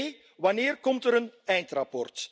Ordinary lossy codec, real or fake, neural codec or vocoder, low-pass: none; real; none; none